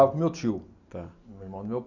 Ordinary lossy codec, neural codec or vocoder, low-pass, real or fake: none; none; 7.2 kHz; real